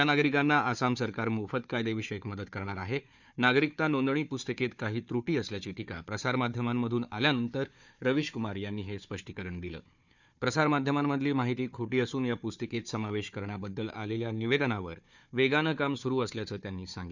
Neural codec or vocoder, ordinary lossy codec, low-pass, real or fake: codec, 16 kHz, 4 kbps, FunCodec, trained on Chinese and English, 50 frames a second; none; 7.2 kHz; fake